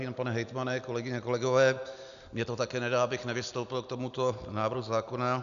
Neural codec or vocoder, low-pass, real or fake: none; 7.2 kHz; real